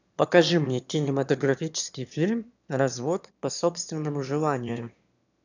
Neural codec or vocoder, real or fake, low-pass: autoencoder, 22.05 kHz, a latent of 192 numbers a frame, VITS, trained on one speaker; fake; 7.2 kHz